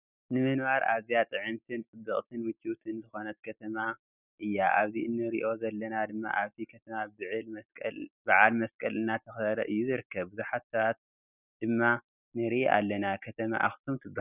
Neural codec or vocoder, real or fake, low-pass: none; real; 3.6 kHz